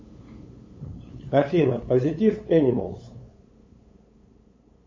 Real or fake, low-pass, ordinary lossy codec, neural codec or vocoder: fake; 7.2 kHz; MP3, 32 kbps; codec, 16 kHz, 8 kbps, FunCodec, trained on LibriTTS, 25 frames a second